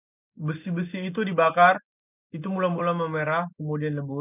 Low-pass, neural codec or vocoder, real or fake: 3.6 kHz; none; real